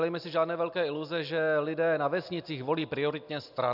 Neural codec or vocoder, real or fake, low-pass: none; real; 5.4 kHz